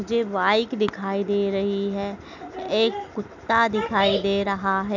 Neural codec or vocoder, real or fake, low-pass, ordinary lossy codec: none; real; 7.2 kHz; none